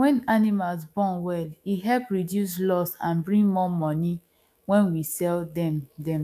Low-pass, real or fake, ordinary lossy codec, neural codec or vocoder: 14.4 kHz; fake; none; autoencoder, 48 kHz, 128 numbers a frame, DAC-VAE, trained on Japanese speech